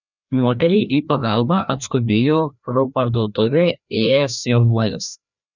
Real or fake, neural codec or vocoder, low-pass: fake; codec, 16 kHz, 1 kbps, FreqCodec, larger model; 7.2 kHz